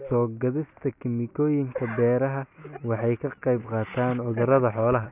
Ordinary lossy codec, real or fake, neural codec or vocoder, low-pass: none; real; none; 3.6 kHz